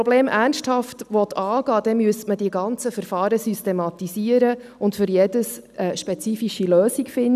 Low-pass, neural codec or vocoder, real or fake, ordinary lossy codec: 14.4 kHz; none; real; none